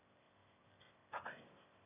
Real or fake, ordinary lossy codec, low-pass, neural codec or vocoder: fake; none; 3.6 kHz; autoencoder, 22.05 kHz, a latent of 192 numbers a frame, VITS, trained on one speaker